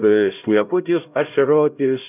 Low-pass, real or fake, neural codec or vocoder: 3.6 kHz; fake; codec, 16 kHz, 0.5 kbps, X-Codec, HuBERT features, trained on LibriSpeech